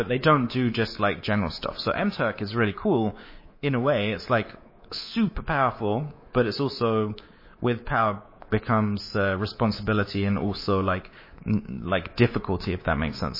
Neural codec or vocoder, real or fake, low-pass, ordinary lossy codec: codec, 24 kHz, 3.1 kbps, DualCodec; fake; 5.4 kHz; MP3, 24 kbps